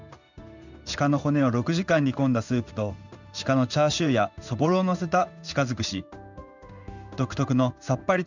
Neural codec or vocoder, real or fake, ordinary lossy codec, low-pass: codec, 16 kHz in and 24 kHz out, 1 kbps, XY-Tokenizer; fake; none; 7.2 kHz